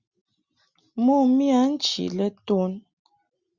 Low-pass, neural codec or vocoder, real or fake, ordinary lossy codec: 7.2 kHz; none; real; Opus, 64 kbps